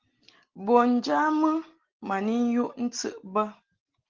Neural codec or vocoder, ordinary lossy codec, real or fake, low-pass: none; Opus, 16 kbps; real; 7.2 kHz